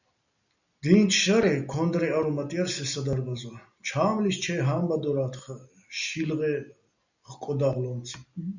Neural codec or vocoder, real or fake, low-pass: none; real; 7.2 kHz